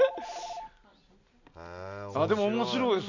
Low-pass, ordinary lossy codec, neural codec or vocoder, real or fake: 7.2 kHz; none; none; real